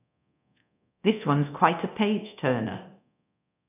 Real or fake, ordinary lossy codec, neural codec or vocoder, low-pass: fake; none; codec, 24 kHz, 0.9 kbps, DualCodec; 3.6 kHz